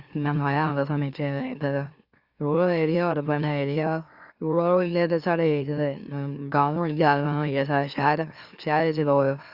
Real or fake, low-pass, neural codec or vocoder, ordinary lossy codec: fake; 5.4 kHz; autoencoder, 44.1 kHz, a latent of 192 numbers a frame, MeloTTS; Opus, 64 kbps